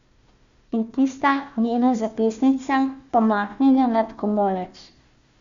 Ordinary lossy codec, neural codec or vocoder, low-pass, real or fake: Opus, 64 kbps; codec, 16 kHz, 1 kbps, FunCodec, trained on Chinese and English, 50 frames a second; 7.2 kHz; fake